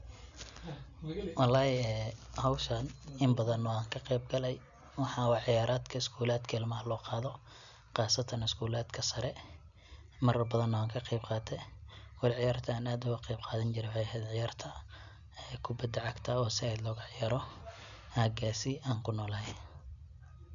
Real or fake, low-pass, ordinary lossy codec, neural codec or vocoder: real; 7.2 kHz; none; none